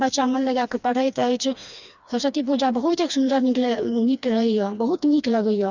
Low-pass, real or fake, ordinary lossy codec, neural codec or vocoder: 7.2 kHz; fake; none; codec, 16 kHz, 2 kbps, FreqCodec, smaller model